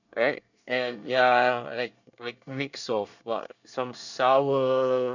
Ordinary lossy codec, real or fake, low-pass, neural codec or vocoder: none; fake; 7.2 kHz; codec, 24 kHz, 1 kbps, SNAC